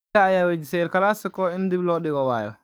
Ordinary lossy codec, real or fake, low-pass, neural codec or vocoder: none; fake; none; codec, 44.1 kHz, 7.8 kbps, DAC